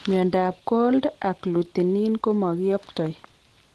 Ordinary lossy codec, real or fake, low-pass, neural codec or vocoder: Opus, 16 kbps; real; 10.8 kHz; none